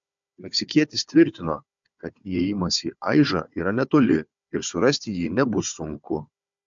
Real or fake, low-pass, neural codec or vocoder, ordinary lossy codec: fake; 7.2 kHz; codec, 16 kHz, 4 kbps, FunCodec, trained on Chinese and English, 50 frames a second; MP3, 64 kbps